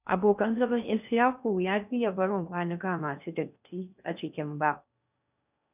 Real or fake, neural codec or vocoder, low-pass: fake; codec, 16 kHz in and 24 kHz out, 0.6 kbps, FocalCodec, streaming, 2048 codes; 3.6 kHz